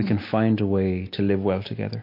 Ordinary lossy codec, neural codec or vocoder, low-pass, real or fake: MP3, 32 kbps; none; 5.4 kHz; real